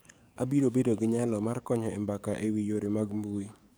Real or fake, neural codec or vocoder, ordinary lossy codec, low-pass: fake; codec, 44.1 kHz, 7.8 kbps, DAC; none; none